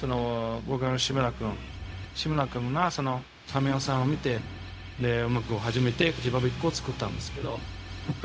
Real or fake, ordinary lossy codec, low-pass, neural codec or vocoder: fake; none; none; codec, 16 kHz, 0.4 kbps, LongCat-Audio-Codec